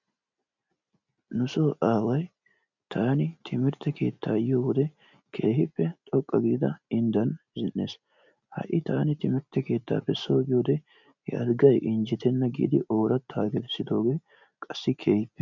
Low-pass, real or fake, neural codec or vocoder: 7.2 kHz; real; none